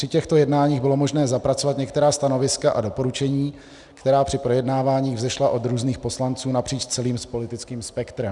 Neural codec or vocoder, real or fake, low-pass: none; real; 10.8 kHz